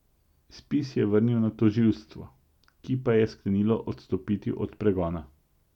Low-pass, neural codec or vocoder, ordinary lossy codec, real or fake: 19.8 kHz; none; none; real